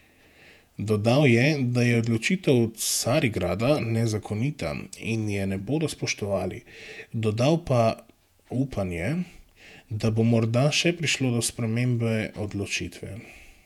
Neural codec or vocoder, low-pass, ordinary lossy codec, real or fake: vocoder, 48 kHz, 128 mel bands, Vocos; 19.8 kHz; none; fake